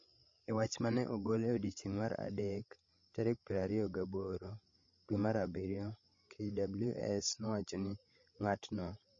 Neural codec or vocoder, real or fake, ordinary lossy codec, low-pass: codec, 16 kHz, 16 kbps, FreqCodec, larger model; fake; MP3, 32 kbps; 7.2 kHz